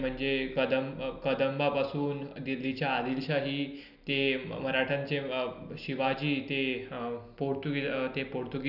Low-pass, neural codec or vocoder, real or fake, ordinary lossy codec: 5.4 kHz; none; real; none